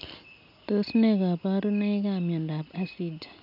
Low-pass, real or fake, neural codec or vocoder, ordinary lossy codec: 5.4 kHz; real; none; none